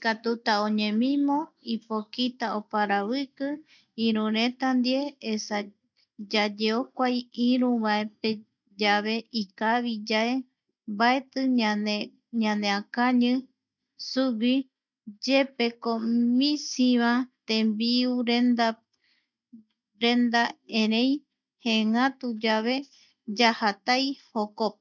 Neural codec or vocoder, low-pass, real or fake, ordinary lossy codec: none; 7.2 kHz; real; none